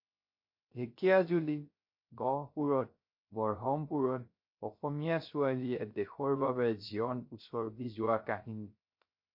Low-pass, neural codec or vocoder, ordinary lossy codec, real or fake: 5.4 kHz; codec, 16 kHz, 0.3 kbps, FocalCodec; MP3, 32 kbps; fake